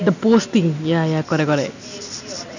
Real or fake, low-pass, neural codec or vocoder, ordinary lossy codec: real; 7.2 kHz; none; none